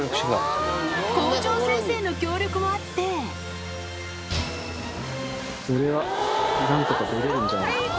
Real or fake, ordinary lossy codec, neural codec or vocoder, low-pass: real; none; none; none